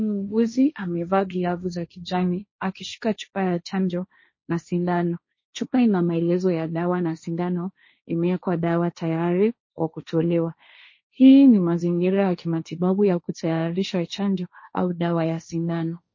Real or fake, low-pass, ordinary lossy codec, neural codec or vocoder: fake; 7.2 kHz; MP3, 32 kbps; codec, 16 kHz, 1.1 kbps, Voila-Tokenizer